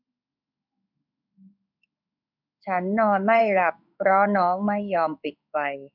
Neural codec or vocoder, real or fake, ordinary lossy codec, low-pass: codec, 16 kHz in and 24 kHz out, 1 kbps, XY-Tokenizer; fake; none; 5.4 kHz